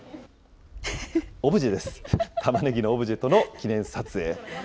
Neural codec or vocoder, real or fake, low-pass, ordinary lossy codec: none; real; none; none